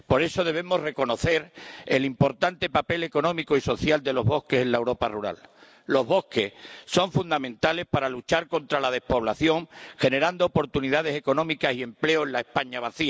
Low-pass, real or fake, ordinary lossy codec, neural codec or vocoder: none; real; none; none